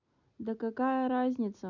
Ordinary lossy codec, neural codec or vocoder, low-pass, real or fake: none; none; 7.2 kHz; real